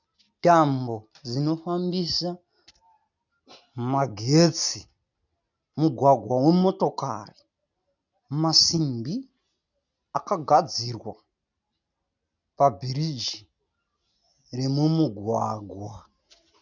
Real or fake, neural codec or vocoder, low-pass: real; none; 7.2 kHz